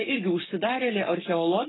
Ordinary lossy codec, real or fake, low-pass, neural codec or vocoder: AAC, 16 kbps; fake; 7.2 kHz; codec, 44.1 kHz, 7.8 kbps, Pupu-Codec